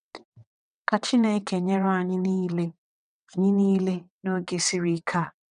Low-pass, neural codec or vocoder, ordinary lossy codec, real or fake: 9.9 kHz; vocoder, 22.05 kHz, 80 mel bands, WaveNeXt; none; fake